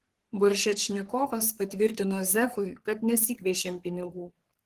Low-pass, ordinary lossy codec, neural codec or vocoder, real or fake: 14.4 kHz; Opus, 16 kbps; codec, 44.1 kHz, 3.4 kbps, Pupu-Codec; fake